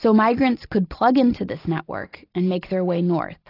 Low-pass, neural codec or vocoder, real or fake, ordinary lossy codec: 5.4 kHz; none; real; AAC, 32 kbps